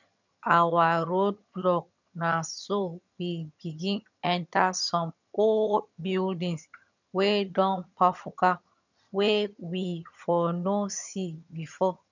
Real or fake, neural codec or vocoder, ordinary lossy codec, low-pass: fake; vocoder, 22.05 kHz, 80 mel bands, HiFi-GAN; none; 7.2 kHz